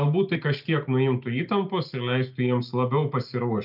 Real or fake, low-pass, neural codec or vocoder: real; 5.4 kHz; none